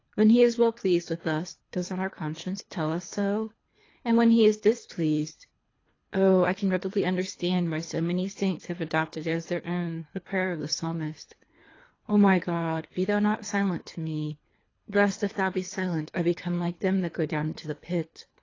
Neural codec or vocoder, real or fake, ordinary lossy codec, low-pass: codec, 24 kHz, 3 kbps, HILCodec; fake; AAC, 32 kbps; 7.2 kHz